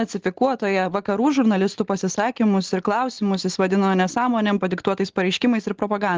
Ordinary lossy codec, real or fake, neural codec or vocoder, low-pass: Opus, 16 kbps; real; none; 7.2 kHz